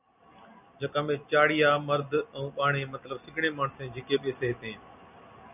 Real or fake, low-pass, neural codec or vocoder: real; 3.6 kHz; none